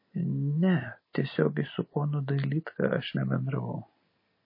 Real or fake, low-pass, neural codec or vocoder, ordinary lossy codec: real; 5.4 kHz; none; MP3, 24 kbps